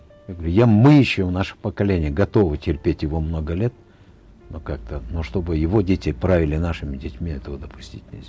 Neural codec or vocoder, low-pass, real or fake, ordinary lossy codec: none; none; real; none